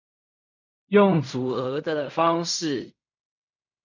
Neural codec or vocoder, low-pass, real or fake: codec, 16 kHz in and 24 kHz out, 0.4 kbps, LongCat-Audio-Codec, fine tuned four codebook decoder; 7.2 kHz; fake